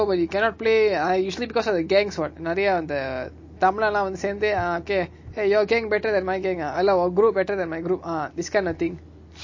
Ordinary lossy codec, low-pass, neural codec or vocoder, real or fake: MP3, 32 kbps; 7.2 kHz; none; real